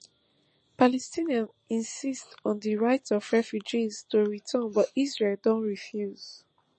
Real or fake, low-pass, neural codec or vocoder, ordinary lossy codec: fake; 10.8 kHz; vocoder, 44.1 kHz, 128 mel bands every 256 samples, BigVGAN v2; MP3, 32 kbps